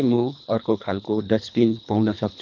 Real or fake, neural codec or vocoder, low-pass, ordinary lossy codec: fake; codec, 24 kHz, 3 kbps, HILCodec; 7.2 kHz; none